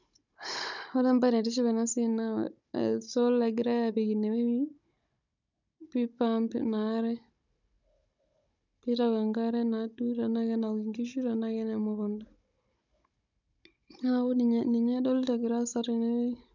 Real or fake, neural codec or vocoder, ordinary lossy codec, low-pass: fake; codec, 16 kHz, 16 kbps, FunCodec, trained on Chinese and English, 50 frames a second; none; 7.2 kHz